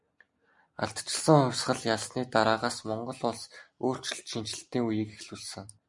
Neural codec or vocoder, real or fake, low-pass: none; real; 10.8 kHz